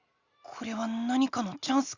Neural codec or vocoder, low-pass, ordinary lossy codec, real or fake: none; 7.2 kHz; Opus, 64 kbps; real